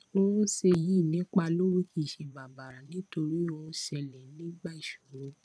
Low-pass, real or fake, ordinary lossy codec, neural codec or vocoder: none; fake; none; vocoder, 22.05 kHz, 80 mel bands, Vocos